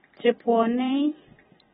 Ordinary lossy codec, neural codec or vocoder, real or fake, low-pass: AAC, 16 kbps; vocoder, 44.1 kHz, 128 mel bands every 512 samples, BigVGAN v2; fake; 19.8 kHz